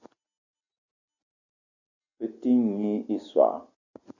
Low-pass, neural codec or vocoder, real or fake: 7.2 kHz; none; real